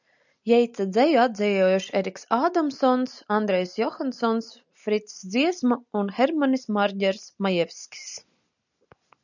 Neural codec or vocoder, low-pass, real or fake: none; 7.2 kHz; real